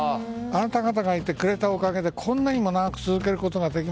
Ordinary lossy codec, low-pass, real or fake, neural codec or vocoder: none; none; real; none